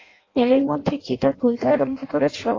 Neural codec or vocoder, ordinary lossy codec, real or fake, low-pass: codec, 16 kHz in and 24 kHz out, 0.6 kbps, FireRedTTS-2 codec; AAC, 32 kbps; fake; 7.2 kHz